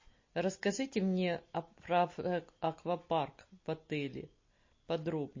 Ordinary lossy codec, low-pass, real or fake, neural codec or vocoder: MP3, 32 kbps; 7.2 kHz; real; none